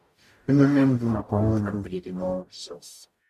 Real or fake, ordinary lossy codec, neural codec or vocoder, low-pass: fake; MP3, 64 kbps; codec, 44.1 kHz, 0.9 kbps, DAC; 14.4 kHz